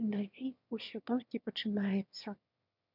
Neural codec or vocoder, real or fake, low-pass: autoencoder, 22.05 kHz, a latent of 192 numbers a frame, VITS, trained on one speaker; fake; 5.4 kHz